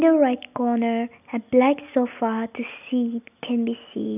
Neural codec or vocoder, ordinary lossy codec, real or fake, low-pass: none; none; real; 3.6 kHz